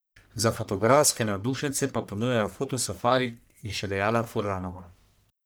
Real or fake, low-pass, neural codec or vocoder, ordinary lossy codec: fake; none; codec, 44.1 kHz, 1.7 kbps, Pupu-Codec; none